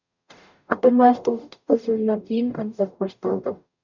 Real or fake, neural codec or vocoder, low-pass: fake; codec, 44.1 kHz, 0.9 kbps, DAC; 7.2 kHz